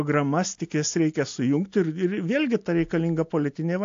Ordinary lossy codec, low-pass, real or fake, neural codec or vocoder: AAC, 48 kbps; 7.2 kHz; real; none